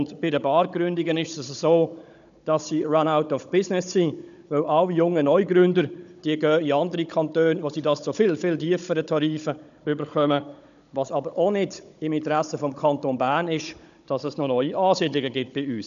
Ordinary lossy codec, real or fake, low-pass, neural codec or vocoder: none; fake; 7.2 kHz; codec, 16 kHz, 16 kbps, FunCodec, trained on Chinese and English, 50 frames a second